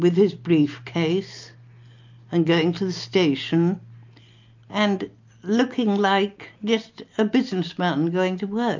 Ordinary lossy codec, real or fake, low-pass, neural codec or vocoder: MP3, 48 kbps; real; 7.2 kHz; none